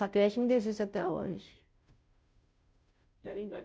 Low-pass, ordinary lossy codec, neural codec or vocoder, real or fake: none; none; codec, 16 kHz, 0.5 kbps, FunCodec, trained on Chinese and English, 25 frames a second; fake